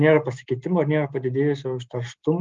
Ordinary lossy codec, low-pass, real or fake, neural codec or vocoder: Opus, 32 kbps; 7.2 kHz; real; none